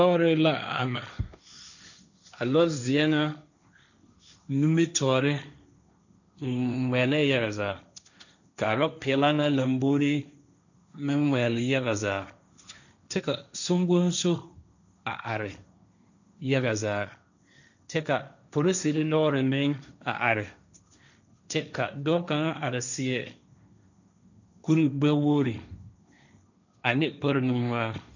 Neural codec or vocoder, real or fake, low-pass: codec, 16 kHz, 1.1 kbps, Voila-Tokenizer; fake; 7.2 kHz